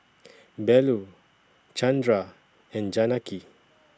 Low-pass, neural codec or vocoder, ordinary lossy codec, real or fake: none; none; none; real